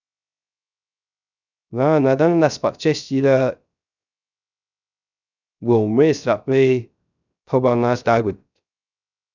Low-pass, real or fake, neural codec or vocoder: 7.2 kHz; fake; codec, 16 kHz, 0.3 kbps, FocalCodec